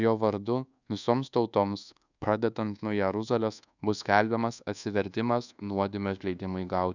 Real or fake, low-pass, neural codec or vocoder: fake; 7.2 kHz; codec, 24 kHz, 1.2 kbps, DualCodec